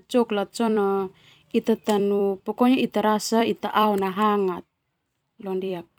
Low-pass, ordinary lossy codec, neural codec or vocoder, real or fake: 19.8 kHz; none; vocoder, 44.1 kHz, 128 mel bands every 256 samples, BigVGAN v2; fake